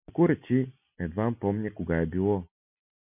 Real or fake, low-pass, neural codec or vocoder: real; 3.6 kHz; none